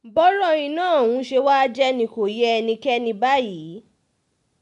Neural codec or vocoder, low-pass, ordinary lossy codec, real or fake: none; 10.8 kHz; none; real